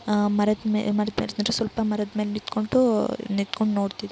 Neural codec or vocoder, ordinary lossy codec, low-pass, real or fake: none; none; none; real